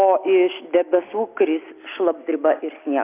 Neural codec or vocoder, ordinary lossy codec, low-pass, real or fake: none; AAC, 24 kbps; 3.6 kHz; real